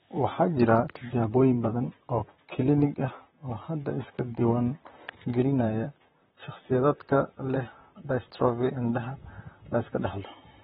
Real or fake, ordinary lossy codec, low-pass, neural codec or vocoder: fake; AAC, 16 kbps; 19.8 kHz; vocoder, 44.1 kHz, 128 mel bands, Pupu-Vocoder